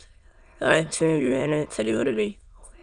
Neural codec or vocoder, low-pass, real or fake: autoencoder, 22.05 kHz, a latent of 192 numbers a frame, VITS, trained on many speakers; 9.9 kHz; fake